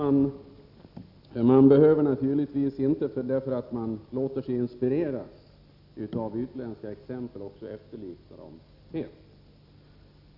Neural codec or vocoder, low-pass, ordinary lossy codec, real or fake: none; 5.4 kHz; none; real